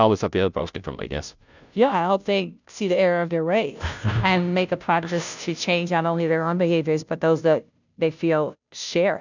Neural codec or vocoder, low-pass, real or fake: codec, 16 kHz, 0.5 kbps, FunCodec, trained on Chinese and English, 25 frames a second; 7.2 kHz; fake